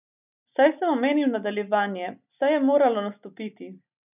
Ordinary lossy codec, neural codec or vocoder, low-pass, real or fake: none; none; 3.6 kHz; real